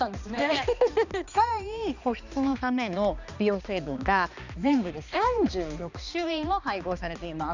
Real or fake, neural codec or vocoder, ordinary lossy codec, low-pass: fake; codec, 16 kHz, 2 kbps, X-Codec, HuBERT features, trained on balanced general audio; none; 7.2 kHz